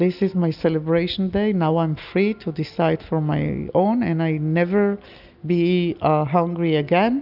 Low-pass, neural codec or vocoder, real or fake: 5.4 kHz; none; real